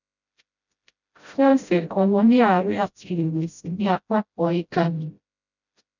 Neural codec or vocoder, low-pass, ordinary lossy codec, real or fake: codec, 16 kHz, 0.5 kbps, FreqCodec, smaller model; 7.2 kHz; none; fake